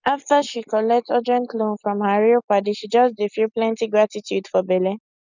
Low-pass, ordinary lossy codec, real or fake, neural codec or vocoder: 7.2 kHz; none; real; none